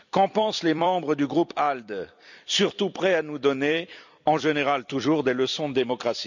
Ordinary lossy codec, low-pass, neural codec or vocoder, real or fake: none; 7.2 kHz; vocoder, 44.1 kHz, 128 mel bands every 256 samples, BigVGAN v2; fake